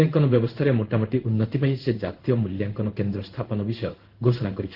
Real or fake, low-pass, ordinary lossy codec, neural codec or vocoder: fake; 5.4 kHz; Opus, 16 kbps; codec, 16 kHz in and 24 kHz out, 1 kbps, XY-Tokenizer